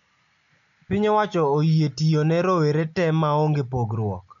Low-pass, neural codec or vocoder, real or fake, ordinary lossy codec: 7.2 kHz; none; real; none